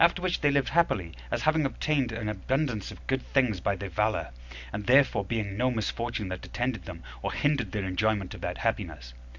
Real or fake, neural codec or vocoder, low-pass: real; none; 7.2 kHz